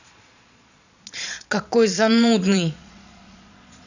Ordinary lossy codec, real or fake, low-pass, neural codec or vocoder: none; fake; 7.2 kHz; vocoder, 22.05 kHz, 80 mel bands, Vocos